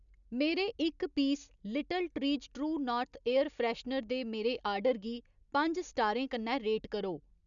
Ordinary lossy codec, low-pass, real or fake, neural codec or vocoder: none; 7.2 kHz; real; none